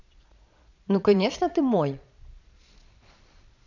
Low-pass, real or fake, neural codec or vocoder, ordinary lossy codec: 7.2 kHz; fake; vocoder, 22.05 kHz, 80 mel bands, Vocos; none